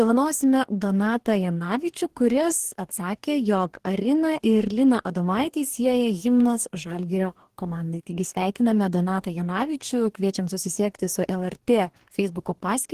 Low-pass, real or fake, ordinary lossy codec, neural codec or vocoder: 14.4 kHz; fake; Opus, 16 kbps; codec, 44.1 kHz, 2.6 kbps, DAC